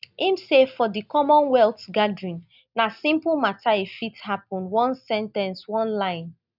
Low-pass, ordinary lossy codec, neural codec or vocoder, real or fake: 5.4 kHz; none; none; real